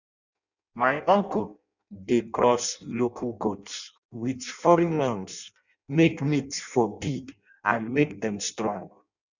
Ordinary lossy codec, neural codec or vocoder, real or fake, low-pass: none; codec, 16 kHz in and 24 kHz out, 0.6 kbps, FireRedTTS-2 codec; fake; 7.2 kHz